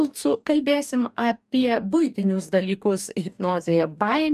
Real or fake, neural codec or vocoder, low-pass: fake; codec, 44.1 kHz, 2.6 kbps, DAC; 14.4 kHz